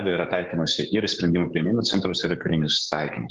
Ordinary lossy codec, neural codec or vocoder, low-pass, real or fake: Opus, 16 kbps; codec, 16 kHz, 6 kbps, DAC; 7.2 kHz; fake